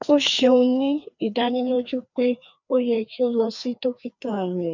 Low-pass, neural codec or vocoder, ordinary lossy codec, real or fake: 7.2 kHz; codec, 16 kHz, 2 kbps, FreqCodec, larger model; none; fake